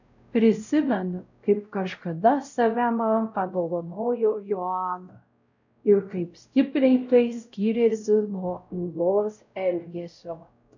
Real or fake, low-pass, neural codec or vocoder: fake; 7.2 kHz; codec, 16 kHz, 0.5 kbps, X-Codec, WavLM features, trained on Multilingual LibriSpeech